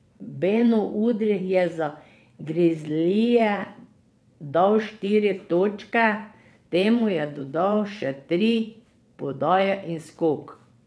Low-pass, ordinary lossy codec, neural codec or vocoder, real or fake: none; none; vocoder, 22.05 kHz, 80 mel bands, WaveNeXt; fake